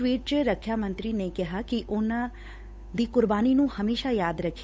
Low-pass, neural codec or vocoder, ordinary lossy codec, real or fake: none; codec, 16 kHz, 8 kbps, FunCodec, trained on Chinese and English, 25 frames a second; none; fake